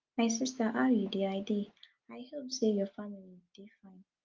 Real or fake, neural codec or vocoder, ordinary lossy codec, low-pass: real; none; Opus, 24 kbps; 7.2 kHz